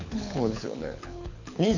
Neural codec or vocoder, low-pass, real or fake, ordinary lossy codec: codec, 24 kHz, 6 kbps, HILCodec; 7.2 kHz; fake; AAC, 48 kbps